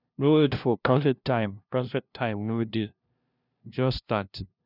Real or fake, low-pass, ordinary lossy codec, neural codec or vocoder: fake; 5.4 kHz; none; codec, 16 kHz, 0.5 kbps, FunCodec, trained on LibriTTS, 25 frames a second